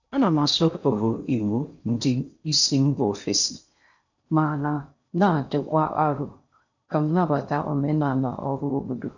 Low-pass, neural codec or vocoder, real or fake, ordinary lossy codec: 7.2 kHz; codec, 16 kHz in and 24 kHz out, 0.6 kbps, FocalCodec, streaming, 4096 codes; fake; none